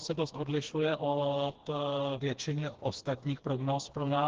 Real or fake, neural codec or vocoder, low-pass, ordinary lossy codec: fake; codec, 16 kHz, 2 kbps, FreqCodec, smaller model; 7.2 kHz; Opus, 16 kbps